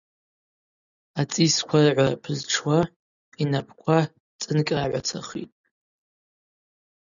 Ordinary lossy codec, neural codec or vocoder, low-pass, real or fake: MP3, 96 kbps; none; 7.2 kHz; real